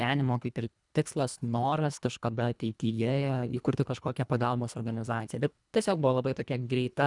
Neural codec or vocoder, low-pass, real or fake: codec, 24 kHz, 1.5 kbps, HILCodec; 10.8 kHz; fake